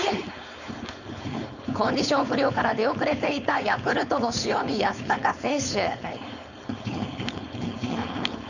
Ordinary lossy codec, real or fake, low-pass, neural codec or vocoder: none; fake; 7.2 kHz; codec, 16 kHz, 4.8 kbps, FACodec